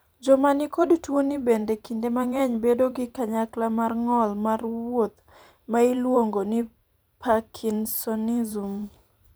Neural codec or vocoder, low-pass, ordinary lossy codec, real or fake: vocoder, 44.1 kHz, 128 mel bands every 512 samples, BigVGAN v2; none; none; fake